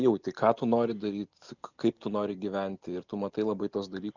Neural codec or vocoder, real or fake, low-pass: none; real; 7.2 kHz